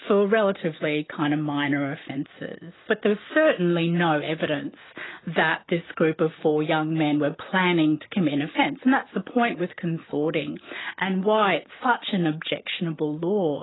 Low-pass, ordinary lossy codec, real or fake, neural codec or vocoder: 7.2 kHz; AAC, 16 kbps; real; none